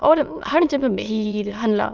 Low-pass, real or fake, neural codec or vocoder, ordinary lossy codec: 7.2 kHz; fake; autoencoder, 22.05 kHz, a latent of 192 numbers a frame, VITS, trained on many speakers; Opus, 24 kbps